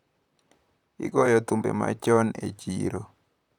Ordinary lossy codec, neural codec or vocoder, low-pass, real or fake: none; vocoder, 44.1 kHz, 128 mel bands, Pupu-Vocoder; 19.8 kHz; fake